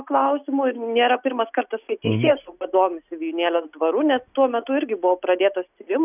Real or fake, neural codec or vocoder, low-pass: real; none; 3.6 kHz